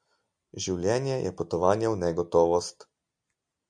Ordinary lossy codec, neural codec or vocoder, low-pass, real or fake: Opus, 64 kbps; none; 9.9 kHz; real